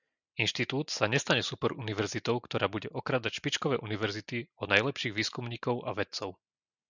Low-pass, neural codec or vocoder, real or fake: 7.2 kHz; none; real